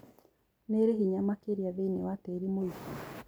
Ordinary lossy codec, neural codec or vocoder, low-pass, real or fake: none; none; none; real